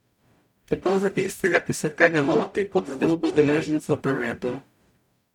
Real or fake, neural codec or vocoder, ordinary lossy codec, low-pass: fake; codec, 44.1 kHz, 0.9 kbps, DAC; none; 19.8 kHz